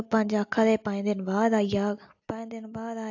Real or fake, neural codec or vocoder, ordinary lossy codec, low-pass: real; none; AAC, 48 kbps; 7.2 kHz